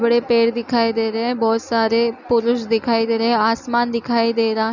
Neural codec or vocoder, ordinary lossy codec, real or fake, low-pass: none; none; real; 7.2 kHz